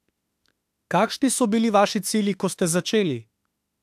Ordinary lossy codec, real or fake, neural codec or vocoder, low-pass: AAC, 96 kbps; fake; autoencoder, 48 kHz, 32 numbers a frame, DAC-VAE, trained on Japanese speech; 14.4 kHz